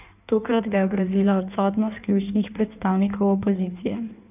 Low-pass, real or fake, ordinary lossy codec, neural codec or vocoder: 3.6 kHz; fake; none; codec, 16 kHz in and 24 kHz out, 1.1 kbps, FireRedTTS-2 codec